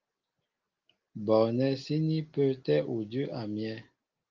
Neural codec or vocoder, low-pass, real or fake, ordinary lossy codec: none; 7.2 kHz; real; Opus, 32 kbps